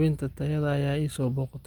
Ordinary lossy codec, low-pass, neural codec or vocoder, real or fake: Opus, 32 kbps; 19.8 kHz; none; real